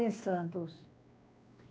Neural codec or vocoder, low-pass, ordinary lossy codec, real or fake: codec, 16 kHz, 2 kbps, X-Codec, WavLM features, trained on Multilingual LibriSpeech; none; none; fake